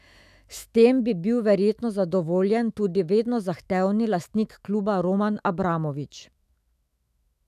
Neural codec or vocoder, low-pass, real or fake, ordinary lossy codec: autoencoder, 48 kHz, 128 numbers a frame, DAC-VAE, trained on Japanese speech; 14.4 kHz; fake; none